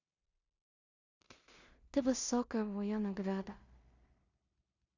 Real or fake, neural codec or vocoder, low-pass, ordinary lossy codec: fake; codec, 16 kHz in and 24 kHz out, 0.4 kbps, LongCat-Audio-Codec, two codebook decoder; 7.2 kHz; Opus, 64 kbps